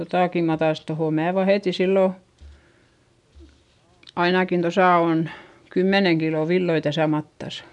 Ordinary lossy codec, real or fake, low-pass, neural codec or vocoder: none; real; 10.8 kHz; none